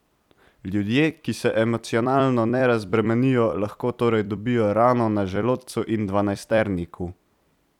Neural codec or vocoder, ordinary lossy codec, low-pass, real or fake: vocoder, 44.1 kHz, 128 mel bands every 256 samples, BigVGAN v2; none; 19.8 kHz; fake